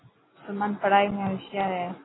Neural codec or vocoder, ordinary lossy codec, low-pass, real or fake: none; AAC, 16 kbps; 7.2 kHz; real